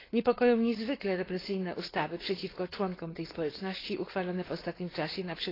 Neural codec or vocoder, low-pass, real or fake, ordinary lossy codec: codec, 16 kHz, 4.8 kbps, FACodec; 5.4 kHz; fake; AAC, 24 kbps